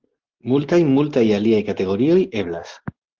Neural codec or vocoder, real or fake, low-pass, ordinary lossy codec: none; real; 7.2 kHz; Opus, 16 kbps